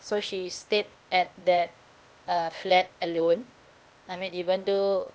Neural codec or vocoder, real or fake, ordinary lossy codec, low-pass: codec, 16 kHz, 0.8 kbps, ZipCodec; fake; none; none